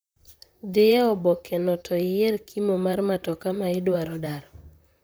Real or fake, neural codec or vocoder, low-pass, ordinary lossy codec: fake; vocoder, 44.1 kHz, 128 mel bands, Pupu-Vocoder; none; none